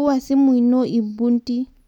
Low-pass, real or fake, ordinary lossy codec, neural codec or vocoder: 19.8 kHz; real; none; none